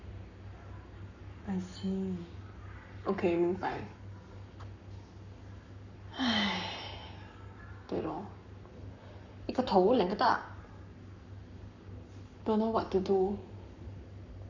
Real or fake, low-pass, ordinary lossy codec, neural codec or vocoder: fake; 7.2 kHz; none; codec, 44.1 kHz, 7.8 kbps, Pupu-Codec